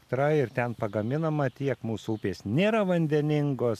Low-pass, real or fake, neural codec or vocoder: 14.4 kHz; real; none